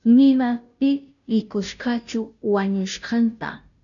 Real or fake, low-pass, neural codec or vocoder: fake; 7.2 kHz; codec, 16 kHz, 0.5 kbps, FunCodec, trained on Chinese and English, 25 frames a second